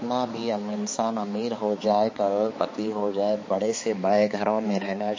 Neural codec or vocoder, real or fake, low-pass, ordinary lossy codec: codec, 16 kHz, 4 kbps, X-Codec, HuBERT features, trained on balanced general audio; fake; 7.2 kHz; MP3, 32 kbps